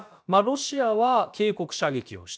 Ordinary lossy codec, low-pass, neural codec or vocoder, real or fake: none; none; codec, 16 kHz, about 1 kbps, DyCAST, with the encoder's durations; fake